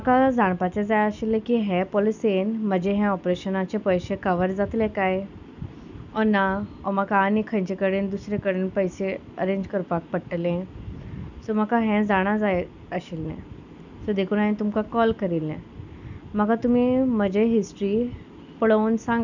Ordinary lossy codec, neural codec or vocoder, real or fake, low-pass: none; none; real; 7.2 kHz